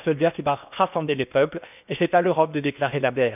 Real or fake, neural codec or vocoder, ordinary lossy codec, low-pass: fake; codec, 16 kHz in and 24 kHz out, 0.8 kbps, FocalCodec, streaming, 65536 codes; none; 3.6 kHz